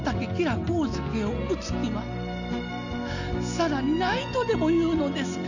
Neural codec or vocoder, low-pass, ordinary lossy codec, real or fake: none; 7.2 kHz; none; real